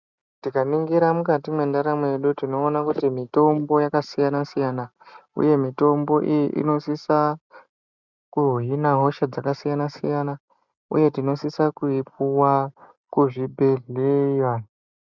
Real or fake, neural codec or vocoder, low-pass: real; none; 7.2 kHz